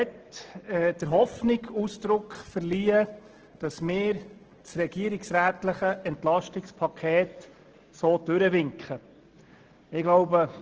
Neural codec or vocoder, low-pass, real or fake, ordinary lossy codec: none; 7.2 kHz; real; Opus, 16 kbps